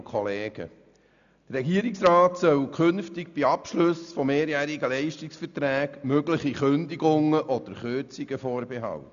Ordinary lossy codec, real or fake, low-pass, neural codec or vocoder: none; real; 7.2 kHz; none